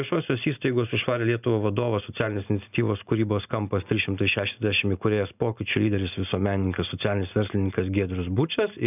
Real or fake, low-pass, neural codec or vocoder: real; 3.6 kHz; none